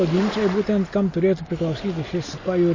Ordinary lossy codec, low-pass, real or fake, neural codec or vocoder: MP3, 32 kbps; 7.2 kHz; real; none